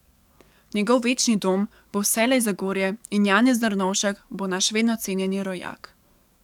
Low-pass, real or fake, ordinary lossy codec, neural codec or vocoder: 19.8 kHz; fake; none; codec, 44.1 kHz, 7.8 kbps, DAC